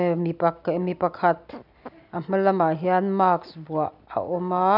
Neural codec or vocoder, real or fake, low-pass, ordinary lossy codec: none; real; 5.4 kHz; none